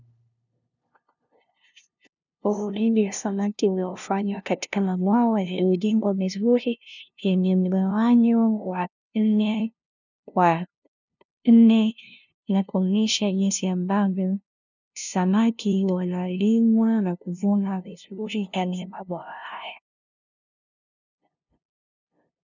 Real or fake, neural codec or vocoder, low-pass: fake; codec, 16 kHz, 0.5 kbps, FunCodec, trained on LibriTTS, 25 frames a second; 7.2 kHz